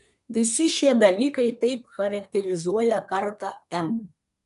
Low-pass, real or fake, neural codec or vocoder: 10.8 kHz; fake; codec, 24 kHz, 1 kbps, SNAC